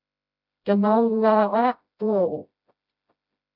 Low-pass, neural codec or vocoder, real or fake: 5.4 kHz; codec, 16 kHz, 0.5 kbps, FreqCodec, smaller model; fake